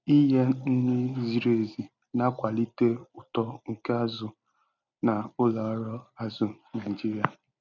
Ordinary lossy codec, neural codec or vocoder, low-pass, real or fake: none; none; 7.2 kHz; real